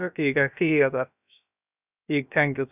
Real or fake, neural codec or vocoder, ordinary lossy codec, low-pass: fake; codec, 16 kHz, about 1 kbps, DyCAST, with the encoder's durations; none; 3.6 kHz